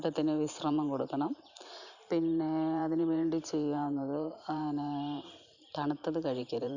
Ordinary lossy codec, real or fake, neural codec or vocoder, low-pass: none; fake; vocoder, 44.1 kHz, 128 mel bands every 512 samples, BigVGAN v2; 7.2 kHz